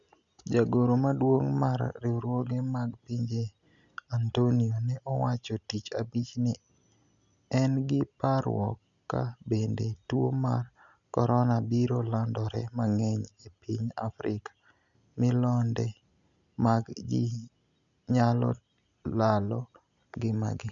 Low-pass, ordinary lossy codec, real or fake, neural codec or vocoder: 7.2 kHz; none; real; none